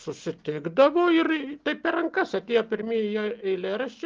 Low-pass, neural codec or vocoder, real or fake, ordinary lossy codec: 7.2 kHz; none; real; Opus, 24 kbps